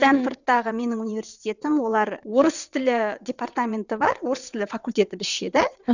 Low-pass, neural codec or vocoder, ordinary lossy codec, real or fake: 7.2 kHz; vocoder, 22.05 kHz, 80 mel bands, WaveNeXt; none; fake